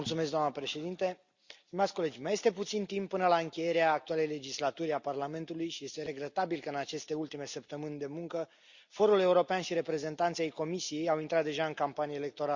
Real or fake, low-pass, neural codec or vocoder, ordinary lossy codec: real; 7.2 kHz; none; Opus, 64 kbps